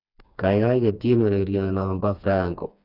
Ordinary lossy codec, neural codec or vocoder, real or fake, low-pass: none; codec, 16 kHz, 2 kbps, FreqCodec, smaller model; fake; 5.4 kHz